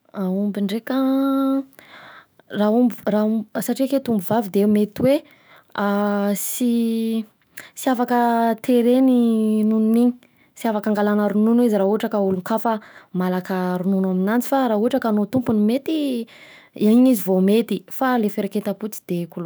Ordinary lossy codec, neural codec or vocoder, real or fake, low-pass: none; autoencoder, 48 kHz, 128 numbers a frame, DAC-VAE, trained on Japanese speech; fake; none